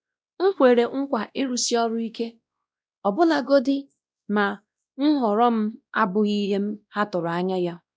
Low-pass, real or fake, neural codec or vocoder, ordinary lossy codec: none; fake; codec, 16 kHz, 1 kbps, X-Codec, WavLM features, trained on Multilingual LibriSpeech; none